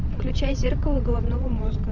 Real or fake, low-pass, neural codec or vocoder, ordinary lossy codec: fake; 7.2 kHz; vocoder, 22.05 kHz, 80 mel bands, WaveNeXt; AAC, 48 kbps